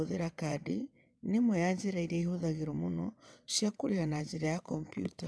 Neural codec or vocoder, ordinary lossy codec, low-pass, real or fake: vocoder, 22.05 kHz, 80 mel bands, WaveNeXt; none; none; fake